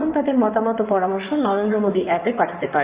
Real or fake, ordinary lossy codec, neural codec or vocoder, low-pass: fake; Opus, 64 kbps; codec, 16 kHz in and 24 kHz out, 2.2 kbps, FireRedTTS-2 codec; 3.6 kHz